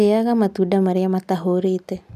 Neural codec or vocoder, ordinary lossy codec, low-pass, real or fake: none; none; 14.4 kHz; real